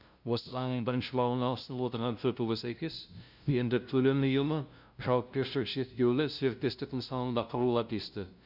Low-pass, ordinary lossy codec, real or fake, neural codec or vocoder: 5.4 kHz; none; fake; codec, 16 kHz, 0.5 kbps, FunCodec, trained on LibriTTS, 25 frames a second